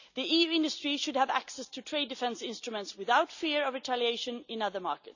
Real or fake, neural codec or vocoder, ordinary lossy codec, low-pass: real; none; none; 7.2 kHz